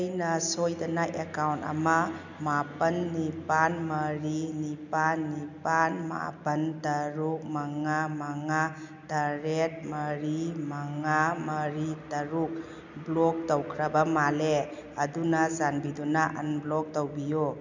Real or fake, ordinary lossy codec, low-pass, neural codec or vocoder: real; none; 7.2 kHz; none